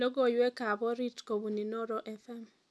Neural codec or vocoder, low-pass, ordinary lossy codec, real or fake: none; none; none; real